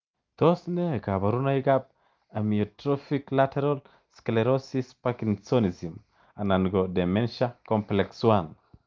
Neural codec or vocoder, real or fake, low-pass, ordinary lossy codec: none; real; 7.2 kHz; Opus, 24 kbps